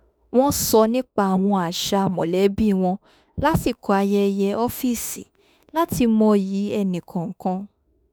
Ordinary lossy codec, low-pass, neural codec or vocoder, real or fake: none; none; autoencoder, 48 kHz, 32 numbers a frame, DAC-VAE, trained on Japanese speech; fake